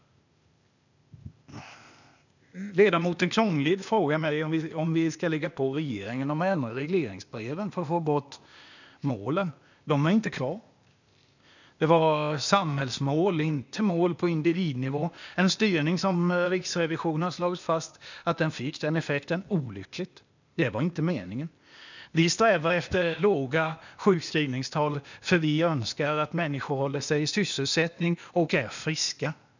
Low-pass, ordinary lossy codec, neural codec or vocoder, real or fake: 7.2 kHz; none; codec, 16 kHz, 0.8 kbps, ZipCodec; fake